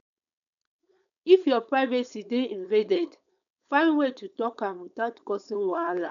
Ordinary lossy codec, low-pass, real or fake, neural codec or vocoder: none; 7.2 kHz; fake; codec, 16 kHz, 4.8 kbps, FACodec